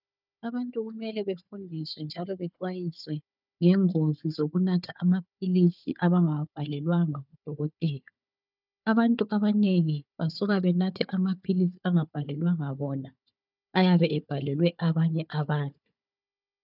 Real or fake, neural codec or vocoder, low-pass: fake; codec, 16 kHz, 4 kbps, FunCodec, trained on Chinese and English, 50 frames a second; 5.4 kHz